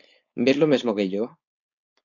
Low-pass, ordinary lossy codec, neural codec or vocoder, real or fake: 7.2 kHz; MP3, 64 kbps; codec, 16 kHz, 4.8 kbps, FACodec; fake